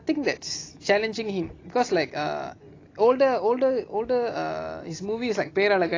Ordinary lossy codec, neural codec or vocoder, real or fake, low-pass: AAC, 32 kbps; none; real; 7.2 kHz